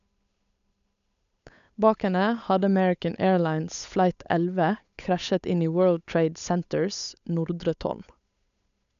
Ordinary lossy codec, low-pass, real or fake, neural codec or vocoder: none; 7.2 kHz; fake; codec, 16 kHz, 8 kbps, FunCodec, trained on Chinese and English, 25 frames a second